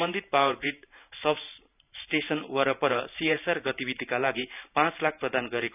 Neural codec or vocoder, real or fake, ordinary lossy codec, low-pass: vocoder, 44.1 kHz, 128 mel bands every 512 samples, BigVGAN v2; fake; none; 3.6 kHz